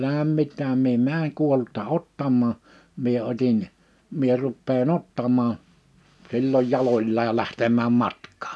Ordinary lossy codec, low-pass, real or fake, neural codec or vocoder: none; none; real; none